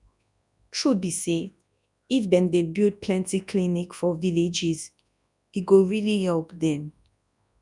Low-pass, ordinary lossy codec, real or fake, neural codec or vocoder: 10.8 kHz; none; fake; codec, 24 kHz, 0.9 kbps, WavTokenizer, large speech release